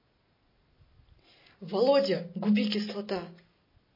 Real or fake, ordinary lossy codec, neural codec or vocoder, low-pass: real; MP3, 24 kbps; none; 5.4 kHz